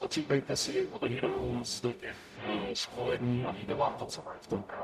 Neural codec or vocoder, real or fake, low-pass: codec, 44.1 kHz, 0.9 kbps, DAC; fake; 14.4 kHz